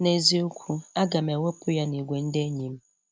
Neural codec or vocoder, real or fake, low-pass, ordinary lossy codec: none; real; none; none